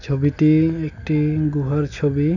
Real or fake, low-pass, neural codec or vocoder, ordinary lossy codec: real; 7.2 kHz; none; none